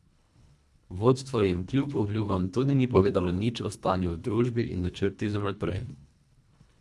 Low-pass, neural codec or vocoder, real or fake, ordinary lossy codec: none; codec, 24 kHz, 1.5 kbps, HILCodec; fake; none